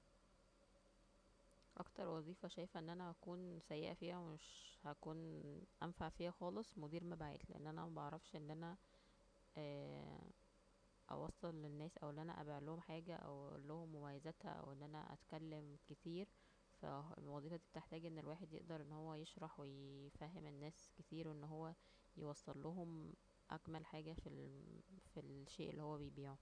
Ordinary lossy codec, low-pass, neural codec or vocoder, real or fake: none; 9.9 kHz; none; real